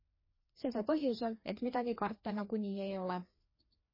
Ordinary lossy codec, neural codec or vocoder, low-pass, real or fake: MP3, 24 kbps; codec, 16 kHz, 2 kbps, FreqCodec, larger model; 5.4 kHz; fake